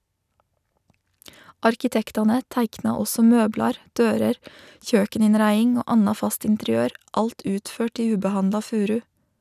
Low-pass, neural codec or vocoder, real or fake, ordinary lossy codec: 14.4 kHz; none; real; none